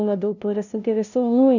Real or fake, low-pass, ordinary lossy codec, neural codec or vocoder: fake; 7.2 kHz; none; codec, 16 kHz, 0.5 kbps, FunCodec, trained on LibriTTS, 25 frames a second